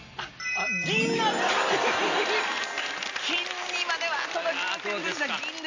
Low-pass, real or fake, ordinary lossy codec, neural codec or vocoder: 7.2 kHz; real; AAC, 48 kbps; none